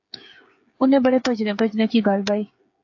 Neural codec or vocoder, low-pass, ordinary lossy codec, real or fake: codec, 16 kHz, 8 kbps, FreqCodec, smaller model; 7.2 kHz; AAC, 48 kbps; fake